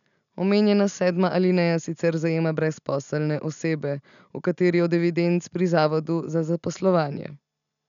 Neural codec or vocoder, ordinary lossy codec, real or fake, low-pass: none; none; real; 7.2 kHz